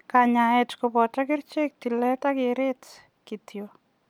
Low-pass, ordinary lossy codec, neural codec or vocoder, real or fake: 19.8 kHz; none; none; real